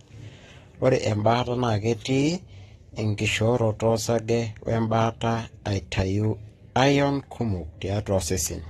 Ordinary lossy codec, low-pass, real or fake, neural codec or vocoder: AAC, 32 kbps; 19.8 kHz; fake; codec, 44.1 kHz, 7.8 kbps, Pupu-Codec